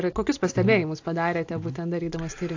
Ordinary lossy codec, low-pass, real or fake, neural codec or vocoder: AAC, 48 kbps; 7.2 kHz; real; none